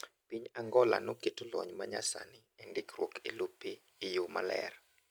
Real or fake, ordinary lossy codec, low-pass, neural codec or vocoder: real; none; none; none